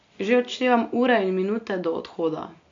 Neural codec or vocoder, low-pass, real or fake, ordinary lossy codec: none; 7.2 kHz; real; MP3, 48 kbps